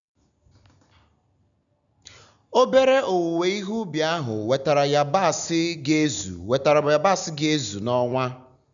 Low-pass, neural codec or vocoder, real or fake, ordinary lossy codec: 7.2 kHz; none; real; none